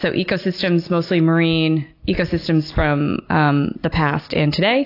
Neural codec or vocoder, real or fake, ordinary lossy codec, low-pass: none; real; AAC, 32 kbps; 5.4 kHz